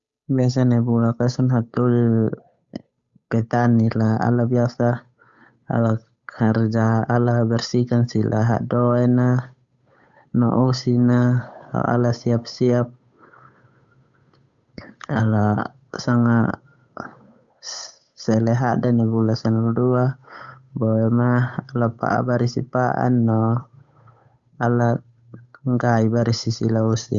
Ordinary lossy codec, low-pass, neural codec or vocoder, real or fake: Opus, 64 kbps; 7.2 kHz; codec, 16 kHz, 8 kbps, FunCodec, trained on Chinese and English, 25 frames a second; fake